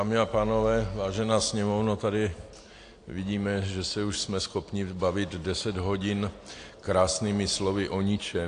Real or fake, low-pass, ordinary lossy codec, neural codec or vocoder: real; 9.9 kHz; AAC, 48 kbps; none